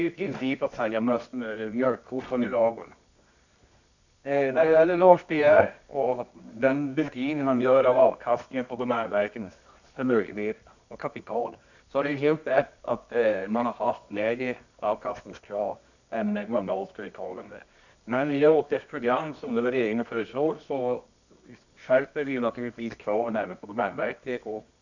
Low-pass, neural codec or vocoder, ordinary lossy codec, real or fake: 7.2 kHz; codec, 24 kHz, 0.9 kbps, WavTokenizer, medium music audio release; none; fake